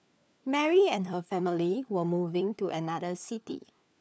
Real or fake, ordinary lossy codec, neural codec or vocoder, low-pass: fake; none; codec, 16 kHz, 4 kbps, FunCodec, trained on LibriTTS, 50 frames a second; none